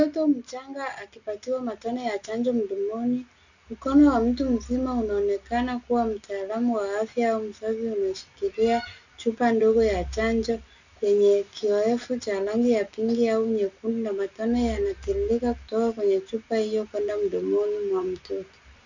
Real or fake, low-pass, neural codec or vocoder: real; 7.2 kHz; none